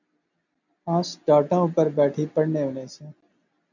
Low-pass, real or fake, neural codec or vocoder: 7.2 kHz; real; none